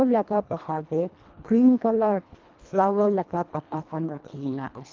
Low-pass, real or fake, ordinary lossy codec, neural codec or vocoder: 7.2 kHz; fake; Opus, 32 kbps; codec, 24 kHz, 1.5 kbps, HILCodec